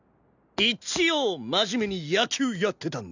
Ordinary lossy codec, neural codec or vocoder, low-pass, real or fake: none; none; 7.2 kHz; real